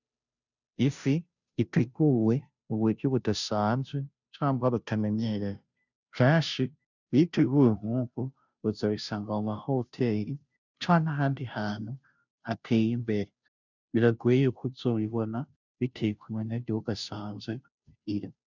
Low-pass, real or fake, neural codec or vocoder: 7.2 kHz; fake; codec, 16 kHz, 0.5 kbps, FunCodec, trained on Chinese and English, 25 frames a second